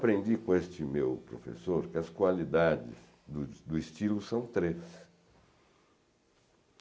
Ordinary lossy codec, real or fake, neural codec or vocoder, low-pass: none; real; none; none